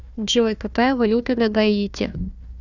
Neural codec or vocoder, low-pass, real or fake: codec, 16 kHz, 1 kbps, FunCodec, trained on Chinese and English, 50 frames a second; 7.2 kHz; fake